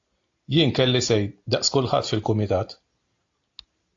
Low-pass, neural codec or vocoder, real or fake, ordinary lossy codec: 7.2 kHz; none; real; AAC, 48 kbps